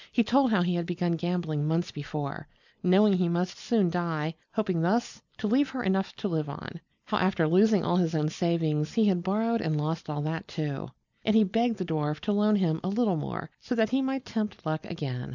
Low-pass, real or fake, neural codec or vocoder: 7.2 kHz; real; none